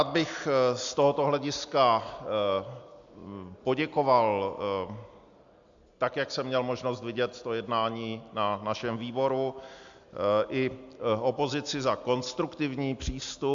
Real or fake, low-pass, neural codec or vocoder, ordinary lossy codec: real; 7.2 kHz; none; AAC, 64 kbps